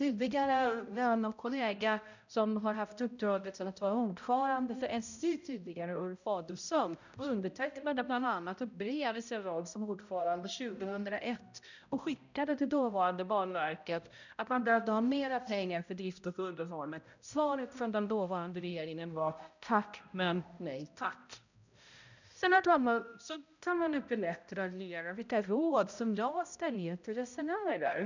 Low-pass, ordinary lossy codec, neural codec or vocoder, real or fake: 7.2 kHz; none; codec, 16 kHz, 0.5 kbps, X-Codec, HuBERT features, trained on balanced general audio; fake